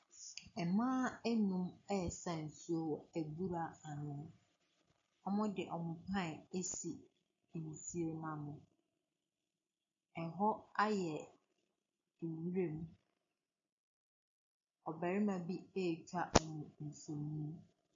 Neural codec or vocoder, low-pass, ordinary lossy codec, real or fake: none; 7.2 kHz; MP3, 48 kbps; real